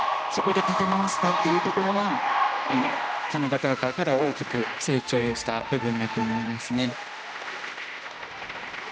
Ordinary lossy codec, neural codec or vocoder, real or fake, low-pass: none; codec, 16 kHz, 1 kbps, X-Codec, HuBERT features, trained on general audio; fake; none